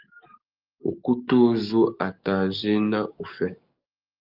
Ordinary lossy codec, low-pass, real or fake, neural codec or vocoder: Opus, 24 kbps; 5.4 kHz; fake; codec, 44.1 kHz, 7.8 kbps, DAC